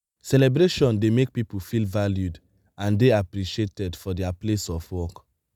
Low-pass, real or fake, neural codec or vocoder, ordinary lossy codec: 19.8 kHz; real; none; none